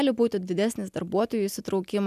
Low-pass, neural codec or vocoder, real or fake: 14.4 kHz; none; real